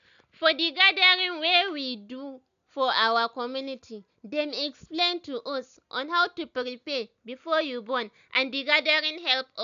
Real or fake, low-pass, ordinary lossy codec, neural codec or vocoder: real; 7.2 kHz; none; none